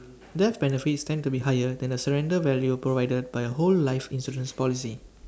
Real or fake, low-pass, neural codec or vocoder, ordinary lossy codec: real; none; none; none